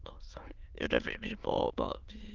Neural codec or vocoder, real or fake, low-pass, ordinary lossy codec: autoencoder, 22.05 kHz, a latent of 192 numbers a frame, VITS, trained on many speakers; fake; 7.2 kHz; Opus, 32 kbps